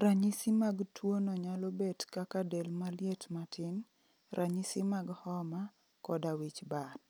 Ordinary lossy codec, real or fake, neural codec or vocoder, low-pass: none; real; none; none